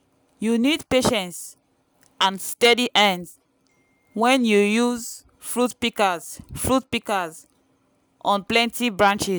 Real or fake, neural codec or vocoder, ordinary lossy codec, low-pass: real; none; none; none